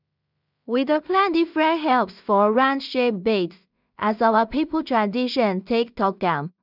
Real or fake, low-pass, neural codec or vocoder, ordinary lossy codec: fake; 5.4 kHz; codec, 16 kHz in and 24 kHz out, 0.4 kbps, LongCat-Audio-Codec, two codebook decoder; none